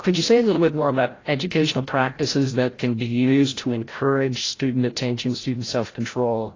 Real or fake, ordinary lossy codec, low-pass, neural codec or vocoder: fake; AAC, 32 kbps; 7.2 kHz; codec, 16 kHz, 0.5 kbps, FreqCodec, larger model